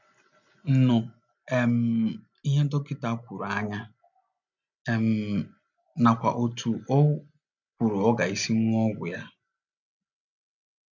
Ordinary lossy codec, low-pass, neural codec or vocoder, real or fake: none; 7.2 kHz; none; real